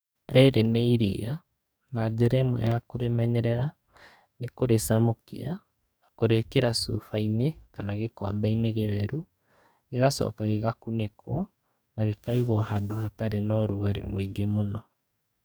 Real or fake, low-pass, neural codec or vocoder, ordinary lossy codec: fake; none; codec, 44.1 kHz, 2.6 kbps, DAC; none